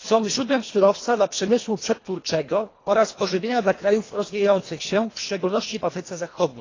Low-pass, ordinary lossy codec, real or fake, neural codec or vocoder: 7.2 kHz; AAC, 32 kbps; fake; codec, 24 kHz, 1.5 kbps, HILCodec